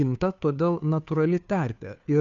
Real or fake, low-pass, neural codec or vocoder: fake; 7.2 kHz; codec, 16 kHz, 2 kbps, FunCodec, trained on Chinese and English, 25 frames a second